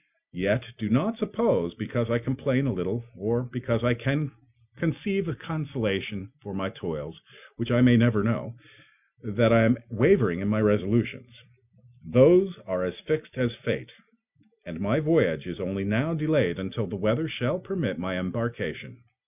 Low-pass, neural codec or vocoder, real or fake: 3.6 kHz; none; real